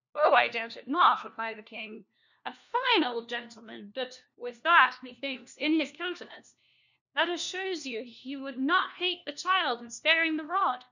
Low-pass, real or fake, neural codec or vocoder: 7.2 kHz; fake; codec, 16 kHz, 1 kbps, FunCodec, trained on LibriTTS, 50 frames a second